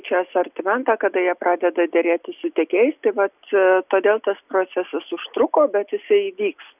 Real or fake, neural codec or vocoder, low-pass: real; none; 3.6 kHz